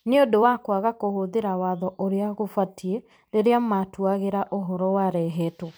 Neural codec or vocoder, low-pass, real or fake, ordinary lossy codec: vocoder, 44.1 kHz, 128 mel bands every 256 samples, BigVGAN v2; none; fake; none